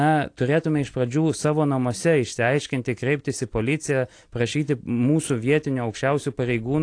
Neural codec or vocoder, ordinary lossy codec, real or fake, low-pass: none; AAC, 48 kbps; real; 9.9 kHz